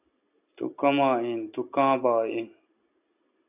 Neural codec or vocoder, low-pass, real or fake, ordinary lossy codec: none; 3.6 kHz; real; AAC, 32 kbps